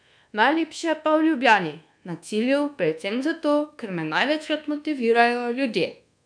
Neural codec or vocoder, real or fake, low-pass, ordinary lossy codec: codec, 24 kHz, 1.2 kbps, DualCodec; fake; 9.9 kHz; none